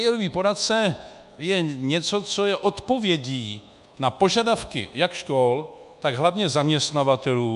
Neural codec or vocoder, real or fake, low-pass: codec, 24 kHz, 1.2 kbps, DualCodec; fake; 10.8 kHz